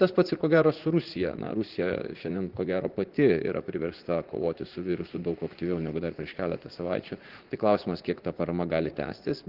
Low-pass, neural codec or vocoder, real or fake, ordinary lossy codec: 5.4 kHz; vocoder, 44.1 kHz, 80 mel bands, Vocos; fake; Opus, 16 kbps